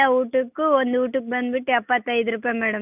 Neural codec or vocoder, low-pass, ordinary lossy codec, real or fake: none; 3.6 kHz; none; real